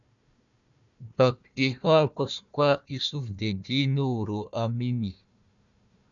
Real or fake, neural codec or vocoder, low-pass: fake; codec, 16 kHz, 1 kbps, FunCodec, trained on Chinese and English, 50 frames a second; 7.2 kHz